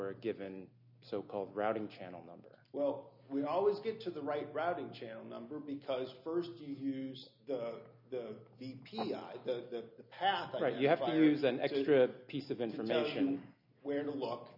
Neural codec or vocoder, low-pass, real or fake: none; 5.4 kHz; real